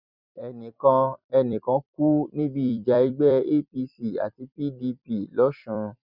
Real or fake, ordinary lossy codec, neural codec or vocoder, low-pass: fake; none; vocoder, 44.1 kHz, 128 mel bands every 256 samples, BigVGAN v2; 5.4 kHz